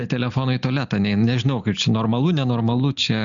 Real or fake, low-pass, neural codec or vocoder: real; 7.2 kHz; none